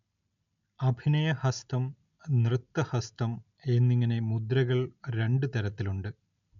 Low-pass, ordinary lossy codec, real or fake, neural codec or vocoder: 7.2 kHz; none; real; none